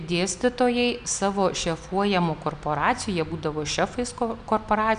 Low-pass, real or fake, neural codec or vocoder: 9.9 kHz; real; none